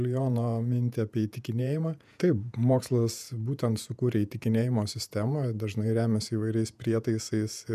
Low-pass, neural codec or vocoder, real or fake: 14.4 kHz; vocoder, 48 kHz, 128 mel bands, Vocos; fake